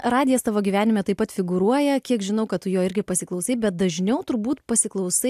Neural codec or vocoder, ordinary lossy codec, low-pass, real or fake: none; AAC, 96 kbps; 14.4 kHz; real